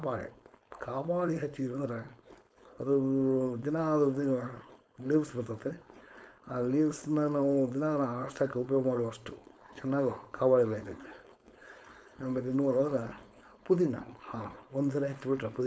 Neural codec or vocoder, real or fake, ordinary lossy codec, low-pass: codec, 16 kHz, 4.8 kbps, FACodec; fake; none; none